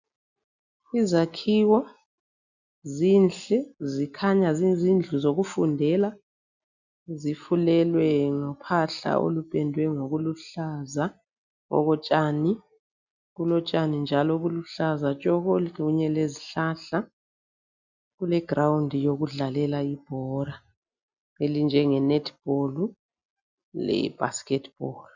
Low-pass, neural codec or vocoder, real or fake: 7.2 kHz; none; real